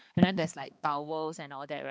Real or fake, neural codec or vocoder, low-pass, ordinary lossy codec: fake; codec, 16 kHz, 2 kbps, X-Codec, HuBERT features, trained on balanced general audio; none; none